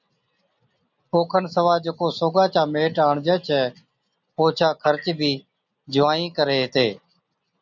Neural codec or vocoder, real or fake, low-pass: none; real; 7.2 kHz